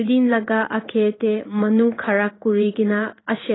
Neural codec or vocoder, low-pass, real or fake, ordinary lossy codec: codec, 16 kHz in and 24 kHz out, 1 kbps, XY-Tokenizer; 7.2 kHz; fake; AAC, 16 kbps